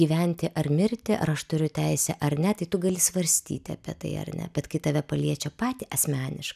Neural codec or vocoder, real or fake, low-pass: none; real; 14.4 kHz